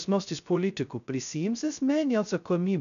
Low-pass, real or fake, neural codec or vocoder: 7.2 kHz; fake; codec, 16 kHz, 0.2 kbps, FocalCodec